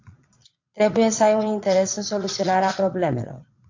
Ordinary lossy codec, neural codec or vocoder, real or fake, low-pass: AAC, 48 kbps; vocoder, 22.05 kHz, 80 mel bands, Vocos; fake; 7.2 kHz